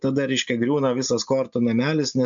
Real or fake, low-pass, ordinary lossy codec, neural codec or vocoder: real; 7.2 kHz; MP3, 64 kbps; none